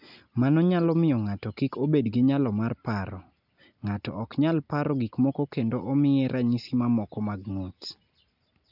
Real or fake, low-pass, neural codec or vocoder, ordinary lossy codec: real; 5.4 kHz; none; none